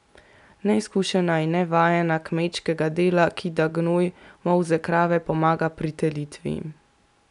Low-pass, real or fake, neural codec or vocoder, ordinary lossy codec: 10.8 kHz; real; none; none